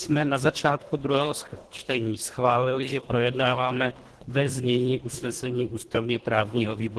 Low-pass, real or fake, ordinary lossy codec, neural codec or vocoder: 10.8 kHz; fake; Opus, 16 kbps; codec, 24 kHz, 1.5 kbps, HILCodec